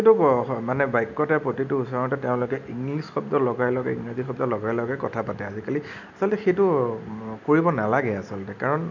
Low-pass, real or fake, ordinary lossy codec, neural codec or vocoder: 7.2 kHz; real; none; none